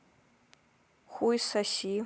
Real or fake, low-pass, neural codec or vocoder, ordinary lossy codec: real; none; none; none